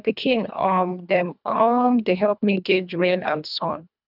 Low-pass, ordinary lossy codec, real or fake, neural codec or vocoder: 5.4 kHz; none; fake; codec, 24 kHz, 1.5 kbps, HILCodec